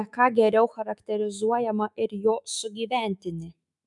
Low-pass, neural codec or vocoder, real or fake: 10.8 kHz; autoencoder, 48 kHz, 128 numbers a frame, DAC-VAE, trained on Japanese speech; fake